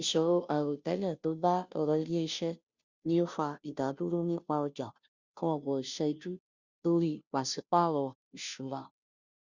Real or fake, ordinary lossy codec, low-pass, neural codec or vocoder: fake; Opus, 64 kbps; 7.2 kHz; codec, 16 kHz, 0.5 kbps, FunCodec, trained on Chinese and English, 25 frames a second